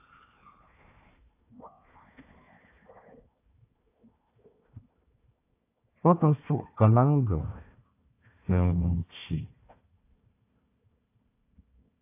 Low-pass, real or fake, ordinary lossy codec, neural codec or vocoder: 3.6 kHz; fake; AAC, 24 kbps; codec, 16 kHz, 1 kbps, FunCodec, trained on Chinese and English, 50 frames a second